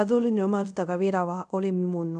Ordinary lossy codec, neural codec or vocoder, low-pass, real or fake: none; codec, 24 kHz, 0.5 kbps, DualCodec; 10.8 kHz; fake